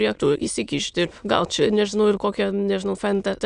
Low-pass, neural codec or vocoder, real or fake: 9.9 kHz; autoencoder, 22.05 kHz, a latent of 192 numbers a frame, VITS, trained on many speakers; fake